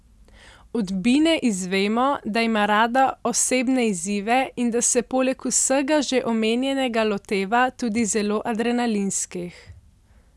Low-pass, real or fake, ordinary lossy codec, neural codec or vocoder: none; real; none; none